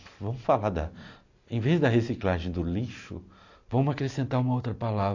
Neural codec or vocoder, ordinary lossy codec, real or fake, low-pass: none; AAC, 48 kbps; real; 7.2 kHz